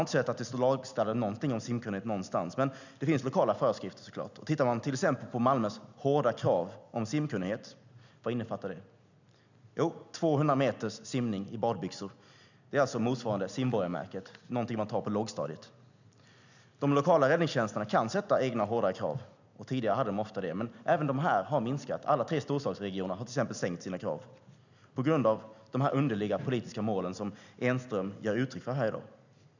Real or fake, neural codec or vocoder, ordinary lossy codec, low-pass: real; none; none; 7.2 kHz